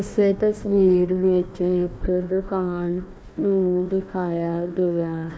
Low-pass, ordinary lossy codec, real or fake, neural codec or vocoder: none; none; fake; codec, 16 kHz, 1 kbps, FunCodec, trained on Chinese and English, 50 frames a second